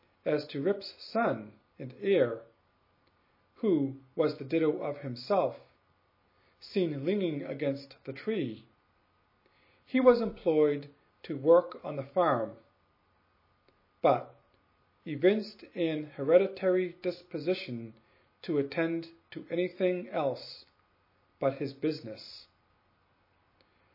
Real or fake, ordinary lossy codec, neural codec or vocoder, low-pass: real; MP3, 24 kbps; none; 5.4 kHz